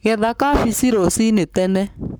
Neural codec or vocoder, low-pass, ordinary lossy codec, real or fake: codec, 44.1 kHz, 7.8 kbps, Pupu-Codec; none; none; fake